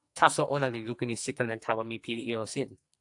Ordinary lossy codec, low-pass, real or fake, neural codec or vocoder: AAC, 64 kbps; 10.8 kHz; fake; codec, 44.1 kHz, 2.6 kbps, SNAC